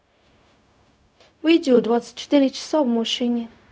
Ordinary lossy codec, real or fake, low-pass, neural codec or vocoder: none; fake; none; codec, 16 kHz, 0.4 kbps, LongCat-Audio-Codec